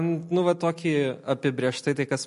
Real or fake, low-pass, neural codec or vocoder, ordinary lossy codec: real; 14.4 kHz; none; MP3, 48 kbps